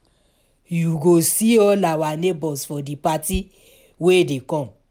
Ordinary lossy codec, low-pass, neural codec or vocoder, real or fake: none; 19.8 kHz; none; real